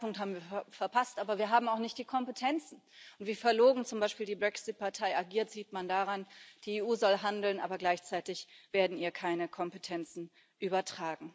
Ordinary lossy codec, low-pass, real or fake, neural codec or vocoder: none; none; real; none